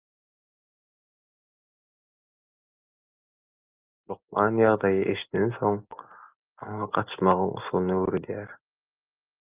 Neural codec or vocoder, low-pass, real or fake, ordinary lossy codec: none; 3.6 kHz; real; Opus, 32 kbps